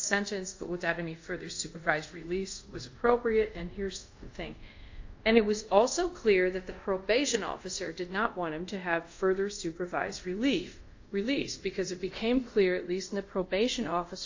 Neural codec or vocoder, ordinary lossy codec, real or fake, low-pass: codec, 24 kHz, 0.5 kbps, DualCodec; AAC, 48 kbps; fake; 7.2 kHz